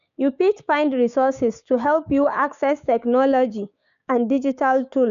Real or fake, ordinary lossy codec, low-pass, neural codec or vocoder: fake; none; 7.2 kHz; codec, 16 kHz, 6 kbps, DAC